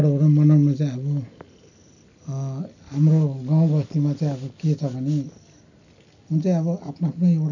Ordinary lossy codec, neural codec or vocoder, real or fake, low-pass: none; none; real; 7.2 kHz